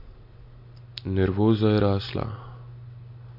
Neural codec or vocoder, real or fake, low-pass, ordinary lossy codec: none; real; 5.4 kHz; MP3, 32 kbps